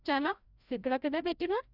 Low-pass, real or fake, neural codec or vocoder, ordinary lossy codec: 5.4 kHz; fake; codec, 16 kHz, 0.5 kbps, FreqCodec, larger model; none